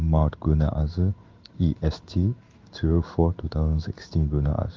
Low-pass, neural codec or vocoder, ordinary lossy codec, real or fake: 7.2 kHz; codec, 16 kHz in and 24 kHz out, 1 kbps, XY-Tokenizer; Opus, 32 kbps; fake